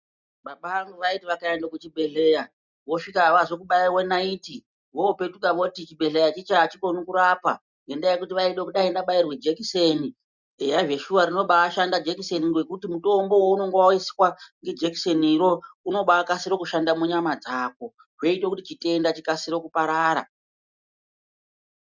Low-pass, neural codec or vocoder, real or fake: 7.2 kHz; none; real